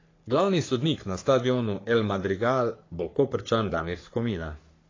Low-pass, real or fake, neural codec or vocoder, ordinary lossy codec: 7.2 kHz; fake; codec, 44.1 kHz, 3.4 kbps, Pupu-Codec; AAC, 32 kbps